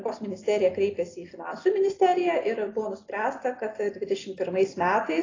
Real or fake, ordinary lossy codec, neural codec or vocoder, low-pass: real; AAC, 32 kbps; none; 7.2 kHz